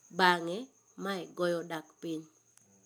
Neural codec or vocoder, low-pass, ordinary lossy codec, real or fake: none; none; none; real